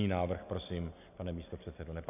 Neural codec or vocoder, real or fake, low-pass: none; real; 3.6 kHz